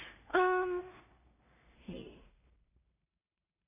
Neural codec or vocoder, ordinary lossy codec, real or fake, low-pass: codec, 16 kHz in and 24 kHz out, 0.4 kbps, LongCat-Audio-Codec, two codebook decoder; none; fake; 3.6 kHz